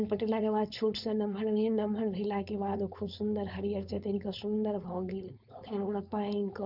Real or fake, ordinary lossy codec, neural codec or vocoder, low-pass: fake; none; codec, 16 kHz, 4.8 kbps, FACodec; 5.4 kHz